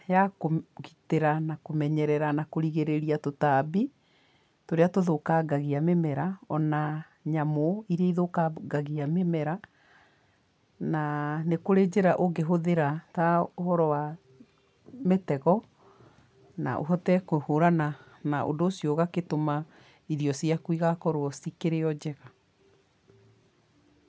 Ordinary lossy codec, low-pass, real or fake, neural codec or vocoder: none; none; real; none